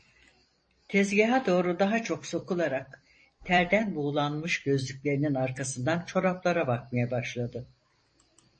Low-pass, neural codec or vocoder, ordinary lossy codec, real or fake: 9.9 kHz; vocoder, 44.1 kHz, 128 mel bands every 256 samples, BigVGAN v2; MP3, 32 kbps; fake